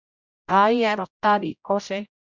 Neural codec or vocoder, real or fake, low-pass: codec, 16 kHz, 0.5 kbps, X-Codec, HuBERT features, trained on general audio; fake; 7.2 kHz